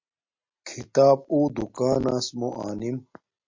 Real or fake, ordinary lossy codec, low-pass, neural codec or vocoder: real; MP3, 48 kbps; 7.2 kHz; none